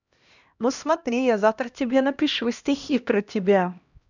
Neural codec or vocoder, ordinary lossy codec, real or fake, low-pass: codec, 16 kHz, 1 kbps, X-Codec, HuBERT features, trained on LibriSpeech; none; fake; 7.2 kHz